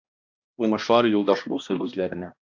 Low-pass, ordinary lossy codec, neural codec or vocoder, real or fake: 7.2 kHz; AAC, 48 kbps; codec, 16 kHz, 1 kbps, X-Codec, HuBERT features, trained on balanced general audio; fake